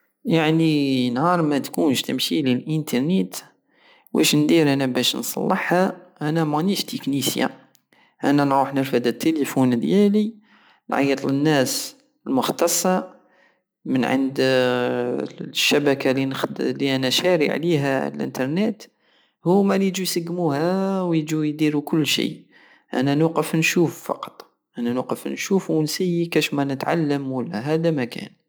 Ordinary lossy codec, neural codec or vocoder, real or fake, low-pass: none; none; real; none